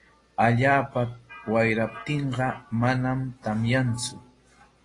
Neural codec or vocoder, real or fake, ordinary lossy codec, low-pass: none; real; AAC, 32 kbps; 10.8 kHz